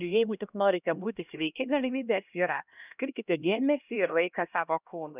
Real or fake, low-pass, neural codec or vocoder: fake; 3.6 kHz; codec, 16 kHz, 1 kbps, X-Codec, HuBERT features, trained on LibriSpeech